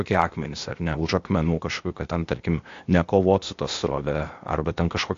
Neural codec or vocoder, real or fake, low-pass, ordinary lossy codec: codec, 16 kHz, 0.8 kbps, ZipCodec; fake; 7.2 kHz; AAC, 48 kbps